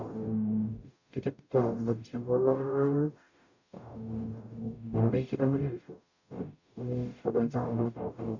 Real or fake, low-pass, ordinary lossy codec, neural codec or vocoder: fake; 7.2 kHz; none; codec, 44.1 kHz, 0.9 kbps, DAC